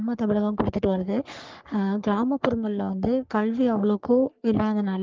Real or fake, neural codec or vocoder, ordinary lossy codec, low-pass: fake; codec, 44.1 kHz, 3.4 kbps, Pupu-Codec; Opus, 32 kbps; 7.2 kHz